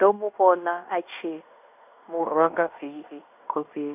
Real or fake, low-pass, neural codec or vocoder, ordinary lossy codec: fake; 3.6 kHz; codec, 16 kHz in and 24 kHz out, 0.9 kbps, LongCat-Audio-Codec, fine tuned four codebook decoder; none